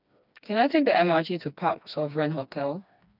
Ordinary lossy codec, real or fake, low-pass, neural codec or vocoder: none; fake; 5.4 kHz; codec, 16 kHz, 2 kbps, FreqCodec, smaller model